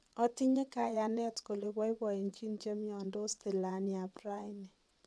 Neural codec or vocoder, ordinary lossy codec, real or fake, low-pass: vocoder, 22.05 kHz, 80 mel bands, WaveNeXt; none; fake; none